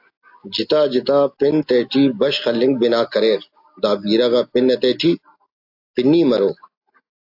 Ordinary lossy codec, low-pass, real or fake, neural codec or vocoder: AAC, 48 kbps; 5.4 kHz; real; none